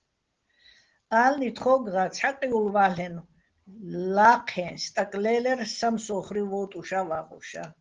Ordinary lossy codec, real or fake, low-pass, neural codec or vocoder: Opus, 16 kbps; real; 7.2 kHz; none